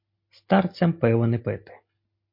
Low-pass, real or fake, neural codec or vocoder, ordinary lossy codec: 5.4 kHz; real; none; MP3, 32 kbps